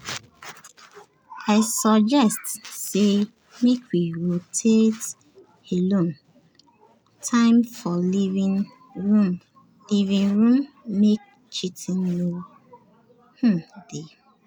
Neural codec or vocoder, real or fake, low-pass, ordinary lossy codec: none; real; none; none